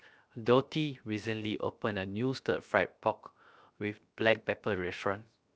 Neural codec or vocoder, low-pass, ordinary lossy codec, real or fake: codec, 16 kHz, 0.7 kbps, FocalCodec; none; none; fake